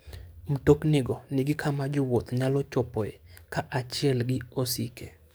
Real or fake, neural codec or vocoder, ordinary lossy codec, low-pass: fake; codec, 44.1 kHz, 7.8 kbps, DAC; none; none